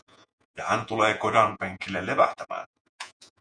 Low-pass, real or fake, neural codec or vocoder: 9.9 kHz; fake; vocoder, 48 kHz, 128 mel bands, Vocos